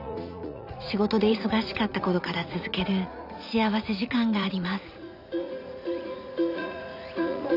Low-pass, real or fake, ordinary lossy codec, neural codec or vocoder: 5.4 kHz; fake; none; vocoder, 44.1 kHz, 80 mel bands, Vocos